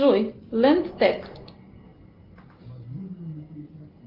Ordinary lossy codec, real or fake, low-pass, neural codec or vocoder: Opus, 32 kbps; real; 5.4 kHz; none